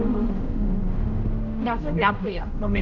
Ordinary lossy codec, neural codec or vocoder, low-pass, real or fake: none; codec, 16 kHz, 0.5 kbps, X-Codec, HuBERT features, trained on general audio; 7.2 kHz; fake